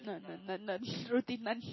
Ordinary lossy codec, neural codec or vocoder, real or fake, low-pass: MP3, 24 kbps; none; real; 7.2 kHz